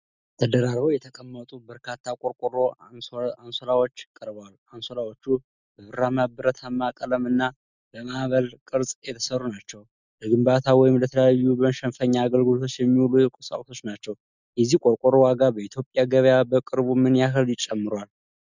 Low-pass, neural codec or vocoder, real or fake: 7.2 kHz; none; real